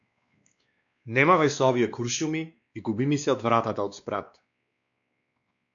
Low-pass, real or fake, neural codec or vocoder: 7.2 kHz; fake; codec, 16 kHz, 2 kbps, X-Codec, WavLM features, trained on Multilingual LibriSpeech